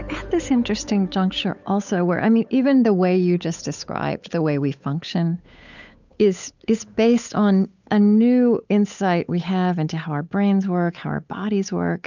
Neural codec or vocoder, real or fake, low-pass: codec, 16 kHz, 8 kbps, FunCodec, trained on Chinese and English, 25 frames a second; fake; 7.2 kHz